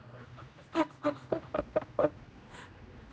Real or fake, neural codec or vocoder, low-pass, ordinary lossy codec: fake; codec, 16 kHz, 1 kbps, X-Codec, HuBERT features, trained on general audio; none; none